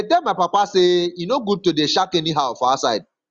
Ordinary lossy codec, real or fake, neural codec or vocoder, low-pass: Opus, 32 kbps; real; none; 7.2 kHz